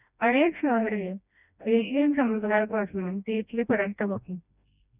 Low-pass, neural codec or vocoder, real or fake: 3.6 kHz; codec, 16 kHz, 1 kbps, FreqCodec, smaller model; fake